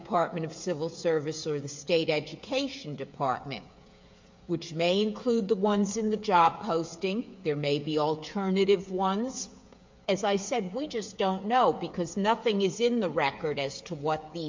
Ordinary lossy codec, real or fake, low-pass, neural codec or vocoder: MP3, 48 kbps; fake; 7.2 kHz; codec, 16 kHz, 8 kbps, FreqCodec, smaller model